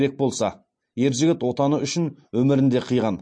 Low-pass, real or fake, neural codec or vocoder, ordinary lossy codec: 9.9 kHz; real; none; MP3, 48 kbps